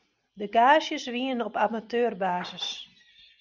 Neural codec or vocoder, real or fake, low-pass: none; real; 7.2 kHz